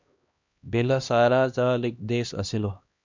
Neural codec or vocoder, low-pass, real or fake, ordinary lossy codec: codec, 16 kHz, 1 kbps, X-Codec, HuBERT features, trained on LibriSpeech; 7.2 kHz; fake; MP3, 64 kbps